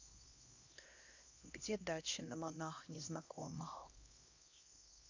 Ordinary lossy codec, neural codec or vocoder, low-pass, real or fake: AAC, 48 kbps; codec, 16 kHz, 1 kbps, X-Codec, HuBERT features, trained on LibriSpeech; 7.2 kHz; fake